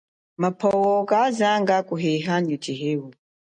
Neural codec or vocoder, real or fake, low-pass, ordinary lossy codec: none; real; 9.9 kHz; MP3, 48 kbps